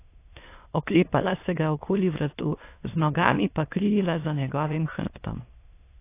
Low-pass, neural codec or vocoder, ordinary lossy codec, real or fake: 3.6 kHz; autoencoder, 22.05 kHz, a latent of 192 numbers a frame, VITS, trained on many speakers; AAC, 24 kbps; fake